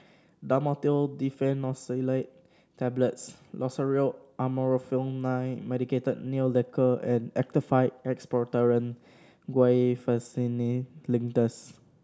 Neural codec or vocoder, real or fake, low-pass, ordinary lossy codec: none; real; none; none